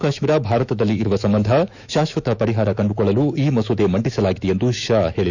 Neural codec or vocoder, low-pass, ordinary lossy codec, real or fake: autoencoder, 48 kHz, 128 numbers a frame, DAC-VAE, trained on Japanese speech; 7.2 kHz; none; fake